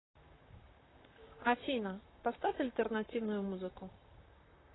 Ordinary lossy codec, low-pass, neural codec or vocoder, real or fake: AAC, 16 kbps; 7.2 kHz; vocoder, 44.1 kHz, 128 mel bands, Pupu-Vocoder; fake